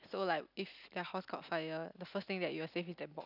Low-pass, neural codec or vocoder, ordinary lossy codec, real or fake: 5.4 kHz; none; none; real